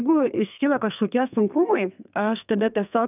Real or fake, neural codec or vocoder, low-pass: fake; codec, 32 kHz, 1.9 kbps, SNAC; 3.6 kHz